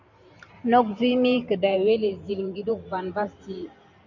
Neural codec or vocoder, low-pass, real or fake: vocoder, 44.1 kHz, 128 mel bands every 512 samples, BigVGAN v2; 7.2 kHz; fake